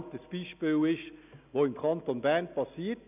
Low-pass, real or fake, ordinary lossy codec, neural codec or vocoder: 3.6 kHz; real; none; none